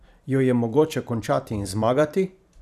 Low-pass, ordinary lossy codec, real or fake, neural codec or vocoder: 14.4 kHz; none; fake; vocoder, 44.1 kHz, 128 mel bands every 512 samples, BigVGAN v2